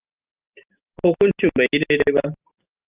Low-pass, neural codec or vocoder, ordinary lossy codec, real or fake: 3.6 kHz; none; Opus, 24 kbps; real